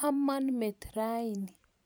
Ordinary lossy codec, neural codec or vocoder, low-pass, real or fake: none; vocoder, 44.1 kHz, 128 mel bands every 512 samples, BigVGAN v2; none; fake